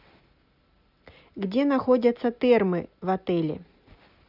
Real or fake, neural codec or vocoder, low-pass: real; none; 5.4 kHz